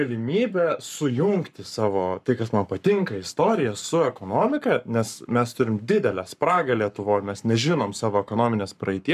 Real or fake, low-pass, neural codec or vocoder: fake; 14.4 kHz; codec, 44.1 kHz, 7.8 kbps, Pupu-Codec